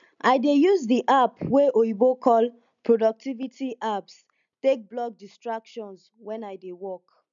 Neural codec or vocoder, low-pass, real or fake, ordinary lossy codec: none; 7.2 kHz; real; none